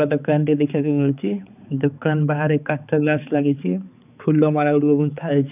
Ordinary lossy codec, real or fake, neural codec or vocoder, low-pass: none; fake; codec, 16 kHz, 4 kbps, X-Codec, HuBERT features, trained on balanced general audio; 3.6 kHz